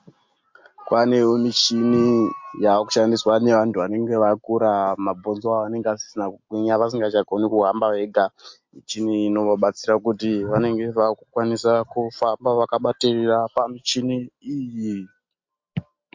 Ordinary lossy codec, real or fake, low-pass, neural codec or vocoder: MP3, 48 kbps; real; 7.2 kHz; none